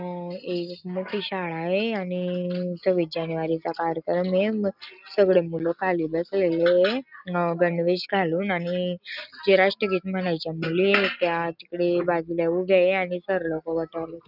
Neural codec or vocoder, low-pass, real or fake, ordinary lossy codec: none; 5.4 kHz; real; none